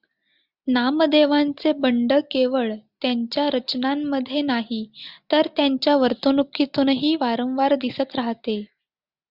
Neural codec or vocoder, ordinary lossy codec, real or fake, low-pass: none; Opus, 64 kbps; real; 5.4 kHz